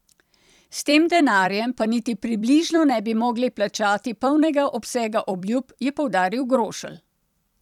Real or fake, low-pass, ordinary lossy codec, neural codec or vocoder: fake; 19.8 kHz; none; vocoder, 44.1 kHz, 128 mel bands every 256 samples, BigVGAN v2